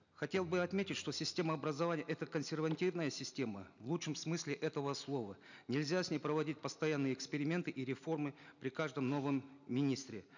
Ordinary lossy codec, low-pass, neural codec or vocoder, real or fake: none; 7.2 kHz; none; real